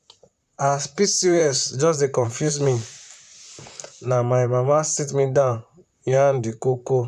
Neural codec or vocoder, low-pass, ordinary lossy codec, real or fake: vocoder, 44.1 kHz, 128 mel bands, Pupu-Vocoder; 14.4 kHz; none; fake